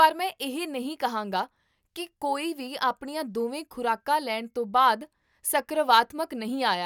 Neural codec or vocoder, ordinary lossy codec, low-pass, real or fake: none; none; 19.8 kHz; real